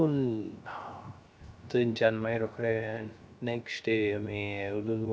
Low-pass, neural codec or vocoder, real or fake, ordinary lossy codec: none; codec, 16 kHz, 0.3 kbps, FocalCodec; fake; none